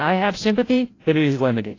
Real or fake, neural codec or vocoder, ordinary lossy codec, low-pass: fake; codec, 16 kHz, 0.5 kbps, FreqCodec, larger model; AAC, 32 kbps; 7.2 kHz